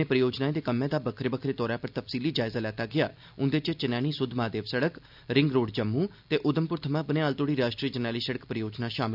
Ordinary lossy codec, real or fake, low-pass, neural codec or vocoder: none; real; 5.4 kHz; none